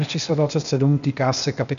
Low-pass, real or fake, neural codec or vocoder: 7.2 kHz; fake; codec, 16 kHz, 0.8 kbps, ZipCodec